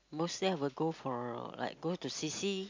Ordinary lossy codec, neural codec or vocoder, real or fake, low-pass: MP3, 48 kbps; none; real; 7.2 kHz